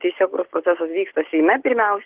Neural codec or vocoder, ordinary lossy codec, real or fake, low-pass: none; Opus, 16 kbps; real; 3.6 kHz